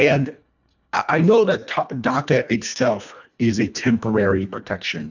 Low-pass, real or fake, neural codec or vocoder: 7.2 kHz; fake; codec, 24 kHz, 1.5 kbps, HILCodec